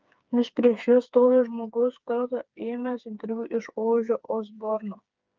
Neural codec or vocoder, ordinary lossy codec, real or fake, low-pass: codec, 16 kHz, 4 kbps, FreqCodec, smaller model; Opus, 24 kbps; fake; 7.2 kHz